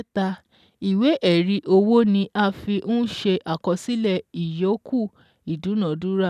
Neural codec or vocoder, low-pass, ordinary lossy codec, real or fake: none; 14.4 kHz; none; real